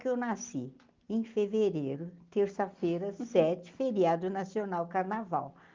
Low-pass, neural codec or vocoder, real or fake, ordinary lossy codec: 7.2 kHz; none; real; Opus, 32 kbps